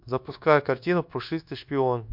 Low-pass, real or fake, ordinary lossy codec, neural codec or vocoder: 5.4 kHz; fake; MP3, 48 kbps; codec, 24 kHz, 1.2 kbps, DualCodec